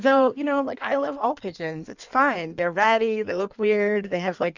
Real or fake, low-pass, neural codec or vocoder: fake; 7.2 kHz; codec, 16 kHz in and 24 kHz out, 1.1 kbps, FireRedTTS-2 codec